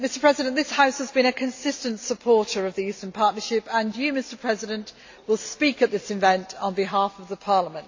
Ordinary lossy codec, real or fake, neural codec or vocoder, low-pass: AAC, 48 kbps; real; none; 7.2 kHz